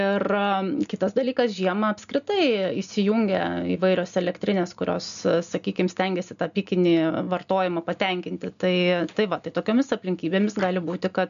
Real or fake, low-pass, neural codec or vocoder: real; 7.2 kHz; none